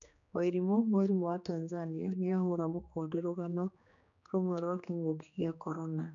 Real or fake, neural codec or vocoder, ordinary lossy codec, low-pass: fake; codec, 16 kHz, 2 kbps, X-Codec, HuBERT features, trained on general audio; none; 7.2 kHz